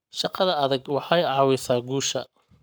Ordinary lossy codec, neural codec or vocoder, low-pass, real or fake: none; codec, 44.1 kHz, 7.8 kbps, Pupu-Codec; none; fake